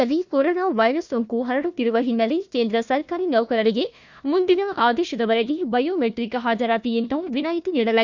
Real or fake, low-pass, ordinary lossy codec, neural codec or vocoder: fake; 7.2 kHz; Opus, 64 kbps; codec, 16 kHz, 1 kbps, FunCodec, trained on Chinese and English, 50 frames a second